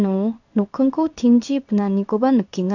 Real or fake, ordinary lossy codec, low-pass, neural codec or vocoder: fake; none; 7.2 kHz; codec, 16 kHz in and 24 kHz out, 1 kbps, XY-Tokenizer